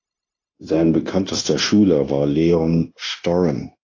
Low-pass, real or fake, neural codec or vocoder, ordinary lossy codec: 7.2 kHz; fake; codec, 16 kHz, 0.9 kbps, LongCat-Audio-Codec; AAC, 32 kbps